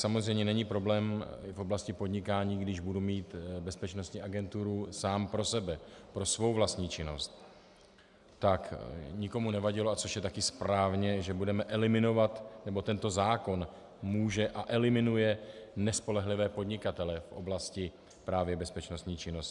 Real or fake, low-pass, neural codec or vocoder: real; 10.8 kHz; none